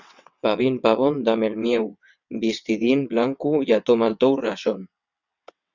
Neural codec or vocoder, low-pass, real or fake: vocoder, 22.05 kHz, 80 mel bands, WaveNeXt; 7.2 kHz; fake